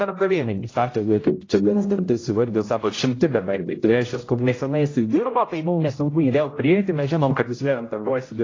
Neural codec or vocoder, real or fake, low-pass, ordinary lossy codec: codec, 16 kHz, 0.5 kbps, X-Codec, HuBERT features, trained on general audio; fake; 7.2 kHz; AAC, 32 kbps